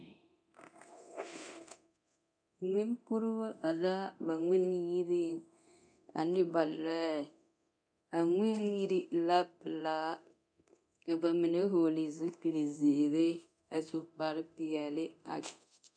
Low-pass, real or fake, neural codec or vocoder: 9.9 kHz; fake; codec, 24 kHz, 0.9 kbps, DualCodec